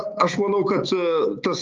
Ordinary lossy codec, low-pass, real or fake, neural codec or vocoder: Opus, 32 kbps; 7.2 kHz; fake; codec, 16 kHz, 6 kbps, DAC